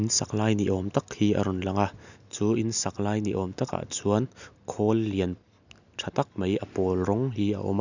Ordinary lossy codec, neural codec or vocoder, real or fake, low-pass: none; none; real; 7.2 kHz